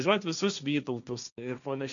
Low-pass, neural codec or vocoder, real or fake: 7.2 kHz; codec, 16 kHz, 1.1 kbps, Voila-Tokenizer; fake